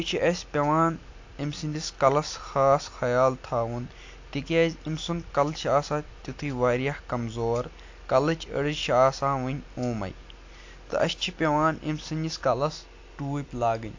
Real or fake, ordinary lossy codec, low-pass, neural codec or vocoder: real; AAC, 48 kbps; 7.2 kHz; none